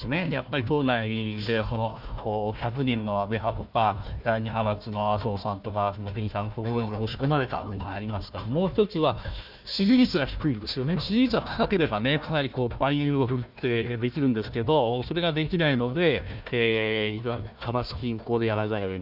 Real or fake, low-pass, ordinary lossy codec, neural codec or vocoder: fake; 5.4 kHz; none; codec, 16 kHz, 1 kbps, FunCodec, trained on Chinese and English, 50 frames a second